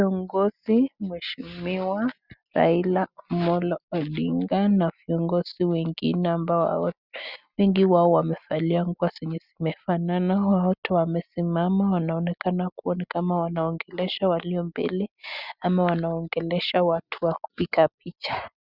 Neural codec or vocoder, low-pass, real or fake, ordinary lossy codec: none; 5.4 kHz; real; Opus, 64 kbps